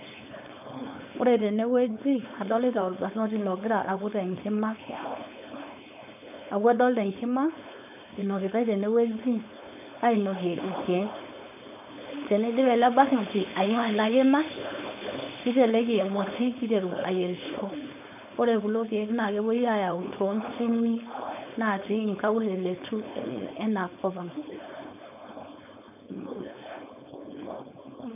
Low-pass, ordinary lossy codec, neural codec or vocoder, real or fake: 3.6 kHz; none; codec, 16 kHz, 4.8 kbps, FACodec; fake